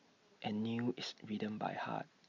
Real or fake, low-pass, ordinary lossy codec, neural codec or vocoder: real; 7.2 kHz; Opus, 64 kbps; none